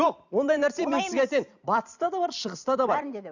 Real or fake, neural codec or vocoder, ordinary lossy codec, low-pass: fake; vocoder, 44.1 kHz, 128 mel bands every 256 samples, BigVGAN v2; none; 7.2 kHz